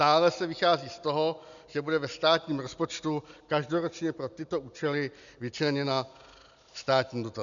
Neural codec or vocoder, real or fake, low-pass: none; real; 7.2 kHz